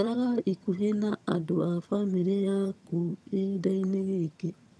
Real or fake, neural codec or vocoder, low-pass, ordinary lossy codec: fake; vocoder, 22.05 kHz, 80 mel bands, HiFi-GAN; none; none